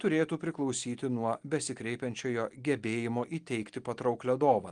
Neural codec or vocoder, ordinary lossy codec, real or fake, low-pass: none; Opus, 24 kbps; real; 9.9 kHz